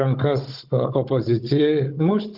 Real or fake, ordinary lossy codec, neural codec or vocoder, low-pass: fake; Opus, 24 kbps; codec, 16 kHz, 16 kbps, FunCodec, trained on Chinese and English, 50 frames a second; 5.4 kHz